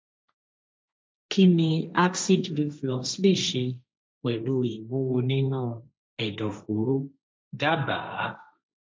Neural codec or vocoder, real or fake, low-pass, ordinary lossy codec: codec, 16 kHz, 1.1 kbps, Voila-Tokenizer; fake; none; none